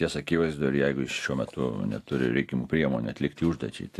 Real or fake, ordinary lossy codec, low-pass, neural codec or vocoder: real; AAC, 64 kbps; 14.4 kHz; none